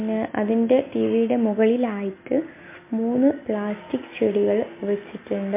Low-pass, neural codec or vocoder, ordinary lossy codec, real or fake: 3.6 kHz; none; MP3, 24 kbps; real